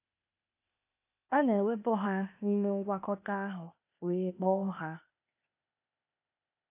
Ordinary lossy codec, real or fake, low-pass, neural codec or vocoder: AAC, 32 kbps; fake; 3.6 kHz; codec, 16 kHz, 0.8 kbps, ZipCodec